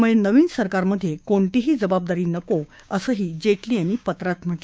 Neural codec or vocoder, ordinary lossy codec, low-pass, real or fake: codec, 16 kHz, 6 kbps, DAC; none; none; fake